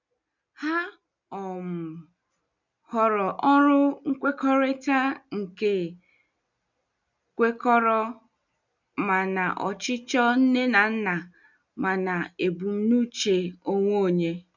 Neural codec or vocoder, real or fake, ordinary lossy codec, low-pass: none; real; none; 7.2 kHz